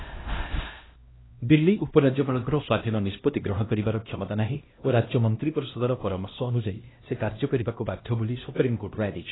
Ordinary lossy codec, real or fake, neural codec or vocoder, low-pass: AAC, 16 kbps; fake; codec, 16 kHz, 1 kbps, X-Codec, HuBERT features, trained on LibriSpeech; 7.2 kHz